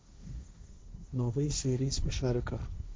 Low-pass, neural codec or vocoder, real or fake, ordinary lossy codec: none; codec, 16 kHz, 1.1 kbps, Voila-Tokenizer; fake; none